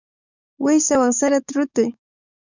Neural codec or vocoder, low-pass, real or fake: codec, 44.1 kHz, 7.8 kbps, DAC; 7.2 kHz; fake